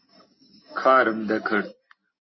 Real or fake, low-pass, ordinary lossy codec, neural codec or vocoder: real; 7.2 kHz; MP3, 24 kbps; none